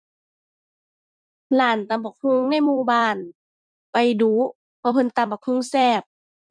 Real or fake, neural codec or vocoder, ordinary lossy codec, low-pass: fake; vocoder, 44.1 kHz, 128 mel bands every 256 samples, BigVGAN v2; none; 9.9 kHz